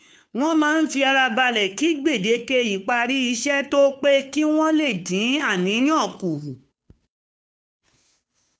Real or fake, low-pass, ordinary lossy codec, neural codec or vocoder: fake; none; none; codec, 16 kHz, 2 kbps, FunCodec, trained on Chinese and English, 25 frames a second